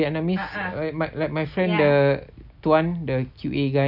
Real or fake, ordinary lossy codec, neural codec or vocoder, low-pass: real; none; none; 5.4 kHz